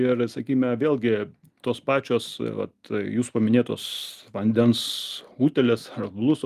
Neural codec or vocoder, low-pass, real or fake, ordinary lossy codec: none; 14.4 kHz; real; Opus, 32 kbps